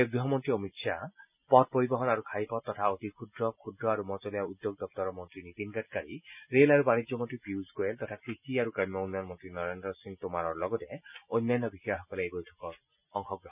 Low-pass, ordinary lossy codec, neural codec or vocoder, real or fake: 3.6 kHz; none; none; real